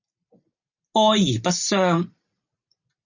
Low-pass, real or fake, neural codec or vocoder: 7.2 kHz; real; none